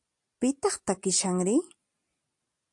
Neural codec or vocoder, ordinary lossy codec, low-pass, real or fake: none; AAC, 64 kbps; 10.8 kHz; real